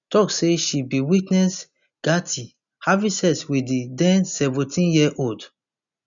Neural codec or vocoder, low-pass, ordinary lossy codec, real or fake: none; 7.2 kHz; none; real